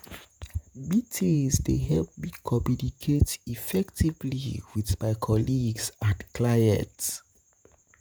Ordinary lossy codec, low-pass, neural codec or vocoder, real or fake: none; none; vocoder, 48 kHz, 128 mel bands, Vocos; fake